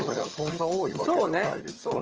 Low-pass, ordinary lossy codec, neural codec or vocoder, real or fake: 7.2 kHz; Opus, 24 kbps; vocoder, 22.05 kHz, 80 mel bands, HiFi-GAN; fake